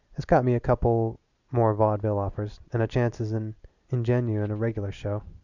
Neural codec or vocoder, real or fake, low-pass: none; real; 7.2 kHz